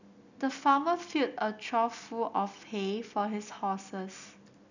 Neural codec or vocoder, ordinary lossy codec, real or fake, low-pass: none; none; real; 7.2 kHz